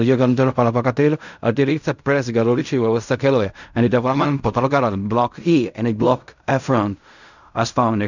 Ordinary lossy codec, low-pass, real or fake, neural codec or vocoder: none; 7.2 kHz; fake; codec, 16 kHz in and 24 kHz out, 0.4 kbps, LongCat-Audio-Codec, fine tuned four codebook decoder